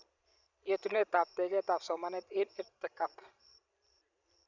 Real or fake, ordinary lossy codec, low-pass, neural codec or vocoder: fake; none; 7.2 kHz; vocoder, 44.1 kHz, 128 mel bands every 512 samples, BigVGAN v2